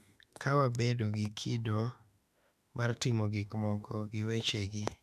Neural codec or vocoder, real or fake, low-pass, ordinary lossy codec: autoencoder, 48 kHz, 32 numbers a frame, DAC-VAE, trained on Japanese speech; fake; 14.4 kHz; none